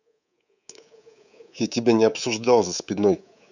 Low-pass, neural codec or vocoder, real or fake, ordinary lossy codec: 7.2 kHz; codec, 24 kHz, 3.1 kbps, DualCodec; fake; none